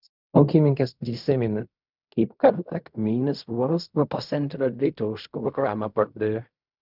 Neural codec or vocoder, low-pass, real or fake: codec, 16 kHz in and 24 kHz out, 0.4 kbps, LongCat-Audio-Codec, fine tuned four codebook decoder; 5.4 kHz; fake